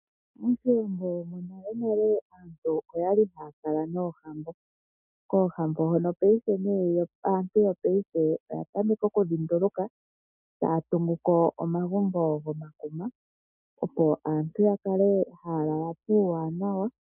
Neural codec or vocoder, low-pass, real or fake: none; 3.6 kHz; real